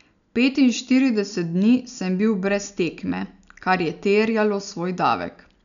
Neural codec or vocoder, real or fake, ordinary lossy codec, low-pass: none; real; none; 7.2 kHz